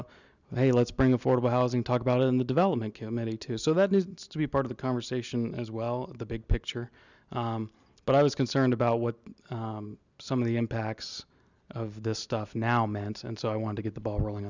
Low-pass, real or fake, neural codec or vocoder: 7.2 kHz; real; none